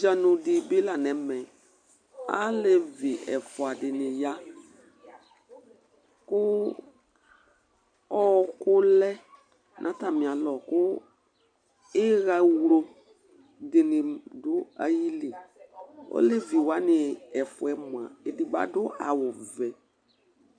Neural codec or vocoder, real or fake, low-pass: none; real; 9.9 kHz